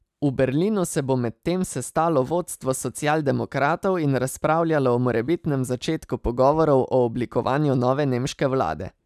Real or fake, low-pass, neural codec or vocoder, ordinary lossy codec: real; 14.4 kHz; none; none